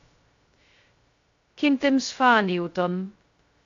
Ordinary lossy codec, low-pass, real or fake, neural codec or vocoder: AAC, 48 kbps; 7.2 kHz; fake; codec, 16 kHz, 0.2 kbps, FocalCodec